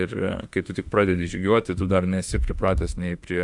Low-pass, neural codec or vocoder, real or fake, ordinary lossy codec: 10.8 kHz; autoencoder, 48 kHz, 32 numbers a frame, DAC-VAE, trained on Japanese speech; fake; AAC, 64 kbps